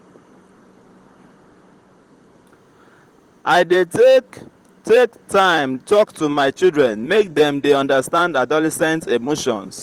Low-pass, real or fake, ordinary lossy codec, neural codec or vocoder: 19.8 kHz; fake; Opus, 24 kbps; vocoder, 44.1 kHz, 128 mel bands, Pupu-Vocoder